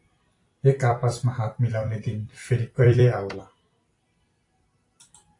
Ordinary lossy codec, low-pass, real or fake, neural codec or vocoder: AAC, 48 kbps; 10.8 kHz; fake; vocoder, 44.1 kHz, 128 mel bands every 256 samples, BigVGAN v2